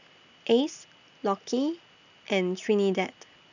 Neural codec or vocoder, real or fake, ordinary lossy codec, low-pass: none; real; none; 7.2 kHz